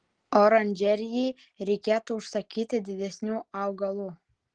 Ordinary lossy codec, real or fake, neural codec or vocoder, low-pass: Opus, 16 kbps; real; none; 9.9 kHz